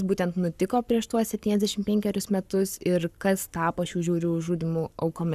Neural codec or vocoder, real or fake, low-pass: codec, 44.1 kHz, 7.8 kbps, Pupu-Codec; fake; 14.4 kHz